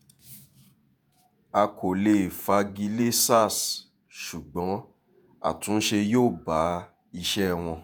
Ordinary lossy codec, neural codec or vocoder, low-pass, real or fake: none; vocoder, 48 kHz, 128 mel bands, Vocos; none; fake